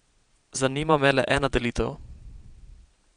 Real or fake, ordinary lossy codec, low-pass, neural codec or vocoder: fake; none; 9.9 kHz; vocoder, 22.05 kHz, 80 mel bands, Vocos